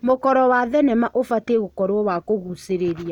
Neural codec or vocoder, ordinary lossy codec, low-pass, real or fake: none; none; 19.8 kHz; real